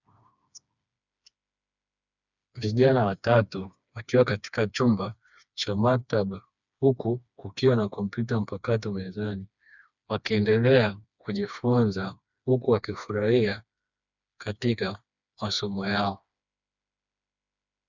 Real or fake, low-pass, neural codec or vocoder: fake; 7.2 kHz; codec, 16 kHz, 2 kbps, FreqCodec, smaller model